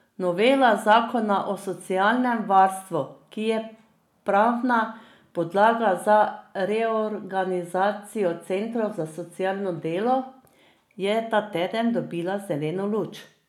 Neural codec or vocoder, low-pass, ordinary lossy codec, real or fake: none; 19.8 kHz; none; real